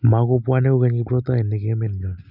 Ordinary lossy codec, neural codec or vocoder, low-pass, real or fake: none; none; 5.4 kHz; real